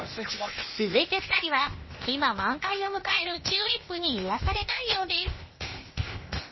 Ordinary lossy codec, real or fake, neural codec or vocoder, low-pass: MP3, 24 kbps; fake; codec, 16 kHz, 0.8 kbps, ZipCodec; 7.2 kHz